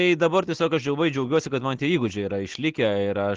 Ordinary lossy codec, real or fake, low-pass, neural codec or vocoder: Opus, 16 kbps; real; 7.2 kHz; none